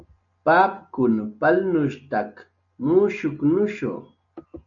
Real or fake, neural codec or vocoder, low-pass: real; none; 7.2 kHz